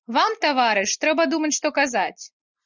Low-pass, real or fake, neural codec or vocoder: 7.2 kHz; real; none